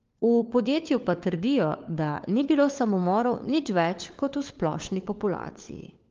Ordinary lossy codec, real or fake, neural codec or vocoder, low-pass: Opus, 32 kbps; fake; codec, 16 kHz, 4 kbps, FunCodec, trained on LibriTTS, 50 frames a second; 7.2 kHz